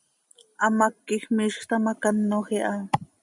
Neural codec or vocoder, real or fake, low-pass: none; real; 10.8 kHz